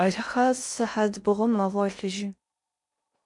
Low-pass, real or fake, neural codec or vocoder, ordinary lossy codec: 10.8 kHz; fake; codec, 16 kHz in and 24 kHz out, 0.6 kbps, FocalCodec, streaming, 4096 codes; MP3, 64 kbps